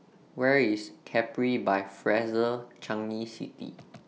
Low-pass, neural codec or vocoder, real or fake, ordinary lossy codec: none; none; real; none